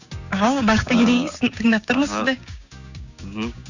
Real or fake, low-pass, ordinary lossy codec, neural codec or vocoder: fake; 7.2 kHz; none; codec, 16 kHz, 6 kbps, DAC